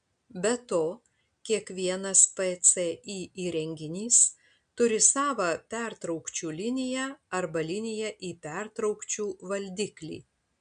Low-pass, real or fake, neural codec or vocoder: 9.9 kHz; real; none